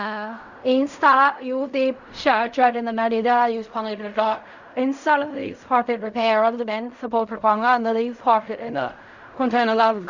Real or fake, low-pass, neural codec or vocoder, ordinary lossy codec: fake; 7.2 kHz; codec, 16 kHz in and 24 kHz out, 0.4 kbps, LongCat-Audio-Codec, fine tuned four codebook decoder; Opus, 64 kbps